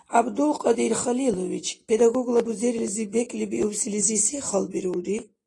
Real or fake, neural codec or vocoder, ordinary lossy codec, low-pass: real; none; AAC, 32 kbps; 10.8 kHz